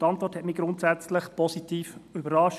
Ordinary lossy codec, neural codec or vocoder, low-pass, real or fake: none; none; 14.4 kHz; real